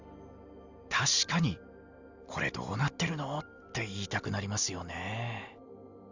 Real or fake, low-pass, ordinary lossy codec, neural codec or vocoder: real; 7.2 kHz; Opus, 64 kbps; none